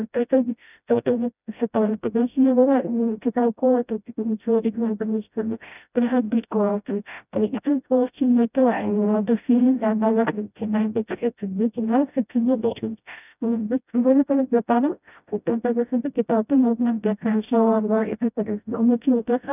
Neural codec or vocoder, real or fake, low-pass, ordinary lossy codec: codec, 16 kHz, 0.5 kbps, FreqCodec, smaller model; fake; 3.6 kHz; none